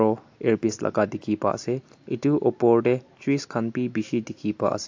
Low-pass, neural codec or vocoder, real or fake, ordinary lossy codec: 7.2 kHz; none; real; AAC, 48 kbps